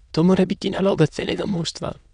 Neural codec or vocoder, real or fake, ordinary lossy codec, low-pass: autoencoder, 22.05 kHz, a latent of 192 numbers a frame, VITS, trained on many speakers; fake; none; 9.9 kHz